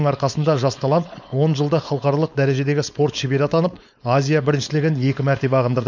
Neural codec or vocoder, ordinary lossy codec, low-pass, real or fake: codec, 16 kHz, 4.8 kbps, FACodec; none; 7.2 kHz; fake